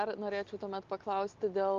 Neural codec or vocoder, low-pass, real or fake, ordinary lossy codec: none; 7.2 kHz; real; Opus, 32 kbps